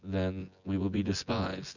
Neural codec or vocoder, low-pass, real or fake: vocoder, 24 kHz, 100 mel bands, Vocos; 7.2 kHz; fake